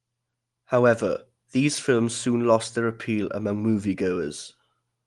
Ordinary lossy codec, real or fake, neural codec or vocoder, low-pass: Opus, 24 kbps; real; none; 10.8 kHz